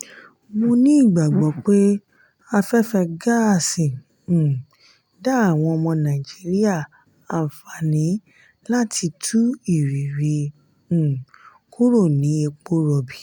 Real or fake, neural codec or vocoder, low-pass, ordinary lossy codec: real; none; none; none